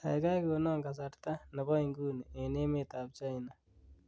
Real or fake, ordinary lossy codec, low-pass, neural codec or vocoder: real; none; none; none